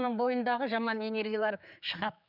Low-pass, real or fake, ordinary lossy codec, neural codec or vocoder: 5.4 kHz; fake; none; codec, 16 kHz, 4 kbps, X-Codec, HuBERT features, trained on general audio